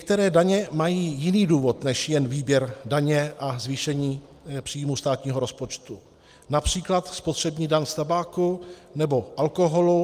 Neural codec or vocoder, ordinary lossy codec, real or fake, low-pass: none; Opus, 32 kbps; real; 14.4 kHz